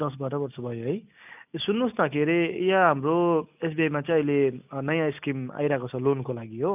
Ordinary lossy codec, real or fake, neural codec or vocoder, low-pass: none; real; none; 3.6 kHz